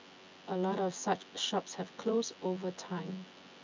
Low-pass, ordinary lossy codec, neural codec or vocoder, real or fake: 7.2 kHz; MP3, 64 kbps; vocoder, 24 kHz, 100 mel bands, Vocos; fake